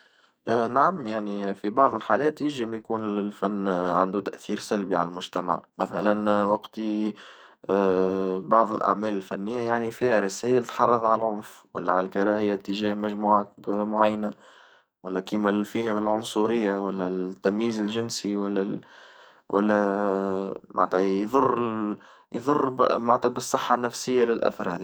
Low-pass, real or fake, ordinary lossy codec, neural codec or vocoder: none; fake; none; codec, 44.1 kHz, 2.6 kbps, SNAC